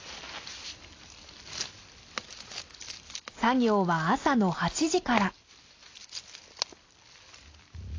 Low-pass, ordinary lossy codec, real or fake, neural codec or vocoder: 7.2 kHz; AAC, 32 kbps; real; none